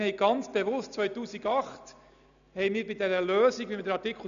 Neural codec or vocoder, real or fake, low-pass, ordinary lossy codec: none; real; 7.2 kHz; none